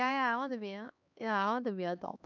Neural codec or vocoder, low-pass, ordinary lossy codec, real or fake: codec, 16 kHz, 2 kbps, X-Codec, HuBERT features, trained on LibriSpeech; 7.2 kHz; none; fake